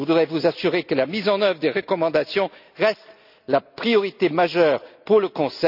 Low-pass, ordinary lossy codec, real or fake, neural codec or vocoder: 5.4 kHz; none; real; none